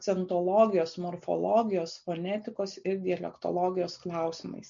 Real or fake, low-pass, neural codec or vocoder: real; 7.2 kHz; none